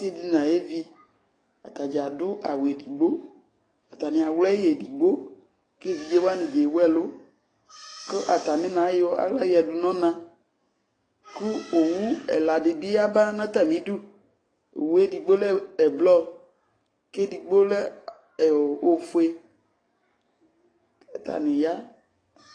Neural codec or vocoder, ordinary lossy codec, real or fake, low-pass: codec, 44.1 kHz, 7.8 kbps, DAC; AAC, 32 kbps; fake; 9.9 kHz